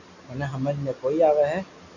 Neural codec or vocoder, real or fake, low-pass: none; real; 7.2 kHz